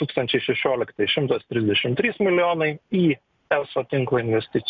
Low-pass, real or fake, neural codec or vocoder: 7.2 kHz; real; none